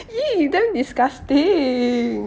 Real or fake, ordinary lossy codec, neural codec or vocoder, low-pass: real; none; none; none